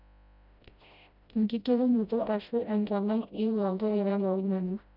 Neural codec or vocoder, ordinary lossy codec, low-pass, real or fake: codec, 16 kHz, 0.5 kbps, FreqCodec, smaller model; none; 5.4 kHz; fake